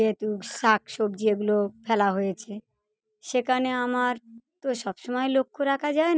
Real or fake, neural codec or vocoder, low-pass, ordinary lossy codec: real; none; none; none